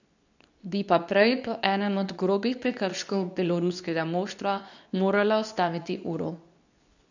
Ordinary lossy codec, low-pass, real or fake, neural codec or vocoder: none; 7.2 kHz; fake; codec, 24 kHz, 0.9 kbps, WavTokenizer, medium speech release version 2